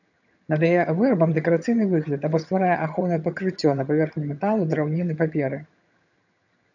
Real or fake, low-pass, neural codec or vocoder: fake; 7.2 kHz; vocoder, 22.05 kHz, 80 mel bands, HiFi-GAN